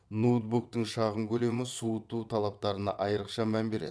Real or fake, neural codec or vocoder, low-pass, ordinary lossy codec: fake; vocoder, 22.05 kHz, 80 mel bands, WaveNeXt; none; none